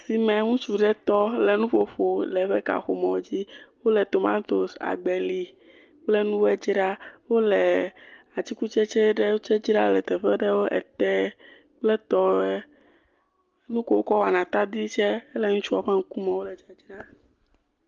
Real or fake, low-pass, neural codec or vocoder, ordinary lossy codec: real; 7.2 kHz; none; Opus, 32 kbps